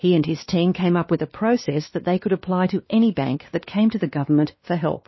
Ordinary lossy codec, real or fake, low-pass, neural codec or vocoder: MP3, 24 kbps; fake; 7.2 kHz; codec, 16 kHz, 2 kbps, X-Codec, WavLM features, trained on Multilingual LibriSpeech